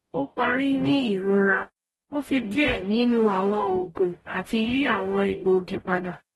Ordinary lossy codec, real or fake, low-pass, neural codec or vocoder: AAC, 32 kbps; fake; 19.8 kHz; codec, 44.1 kHz, 0.9 kbps, DAC